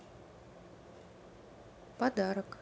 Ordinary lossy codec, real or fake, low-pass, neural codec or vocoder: none; real; none; none